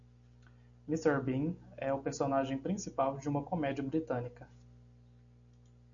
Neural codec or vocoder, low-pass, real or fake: none; 7.2 kHz; real